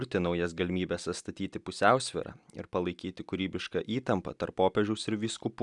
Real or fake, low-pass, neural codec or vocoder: real; 10.8 kHz; none